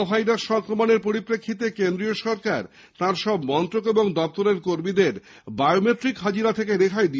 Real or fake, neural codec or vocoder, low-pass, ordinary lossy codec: real; none; none; none